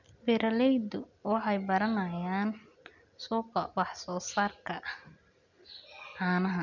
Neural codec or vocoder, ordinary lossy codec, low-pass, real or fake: none; none; 7.2 kHz; real